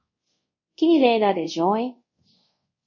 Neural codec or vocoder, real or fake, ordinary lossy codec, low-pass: codec, 24 kHz, 0.5 kbps, DualCodec; fake; MP3, 32 kbps; 7.2 kHz